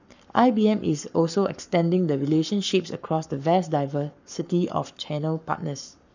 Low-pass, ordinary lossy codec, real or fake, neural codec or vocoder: 7.2 kHz; none; fake; codec, 44.1 kHz, 7.8 kbps, Pupu-Codec